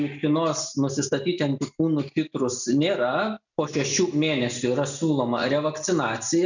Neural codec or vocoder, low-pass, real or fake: none; 7.2 kHz; real